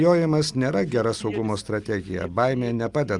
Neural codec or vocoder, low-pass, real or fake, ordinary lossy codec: none; 10.8 kHz; real; Opus, 32 kbps